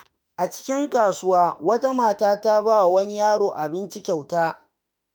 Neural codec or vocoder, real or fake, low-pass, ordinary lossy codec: autoencoder, 48 kHz, 32 numbers a frame, DAC-VAE, trained on Japanese speech; fake; none; none